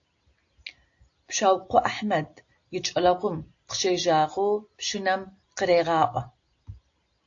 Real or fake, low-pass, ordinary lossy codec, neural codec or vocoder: real; 7.2 kHz; AAC, 48 kbps; none